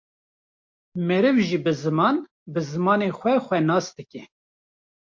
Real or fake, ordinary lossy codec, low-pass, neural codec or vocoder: real; MP3, 48 kbps; 7.2 kHz; none